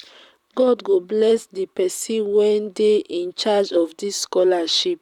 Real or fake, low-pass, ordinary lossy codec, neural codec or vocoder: fake; 19.8 kHz; none; vocoder, 44.1 kHz, 128 mel bands, Pupu-Vocoder